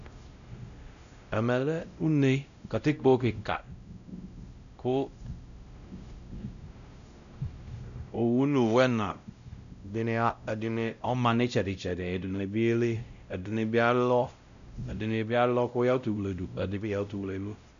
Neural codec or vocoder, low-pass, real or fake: codec, 16 kHz, 0.5 kbps, X-Codec, WavLM features, trained on Multilingual LibriSpeech; 7.2 kHz; fake